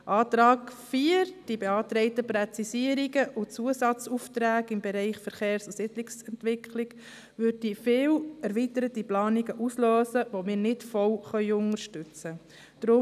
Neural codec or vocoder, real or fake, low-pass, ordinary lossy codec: none; real; 14.4 kHz; none